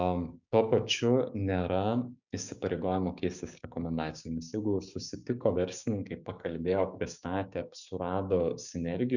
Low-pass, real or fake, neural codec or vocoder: 7.2 kHz; fake; codec, 16 kHz, 6 kbps, DAC